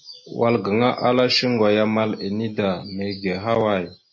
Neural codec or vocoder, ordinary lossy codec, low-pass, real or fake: none; MP3, 32 kbps; 7.2 kHz; real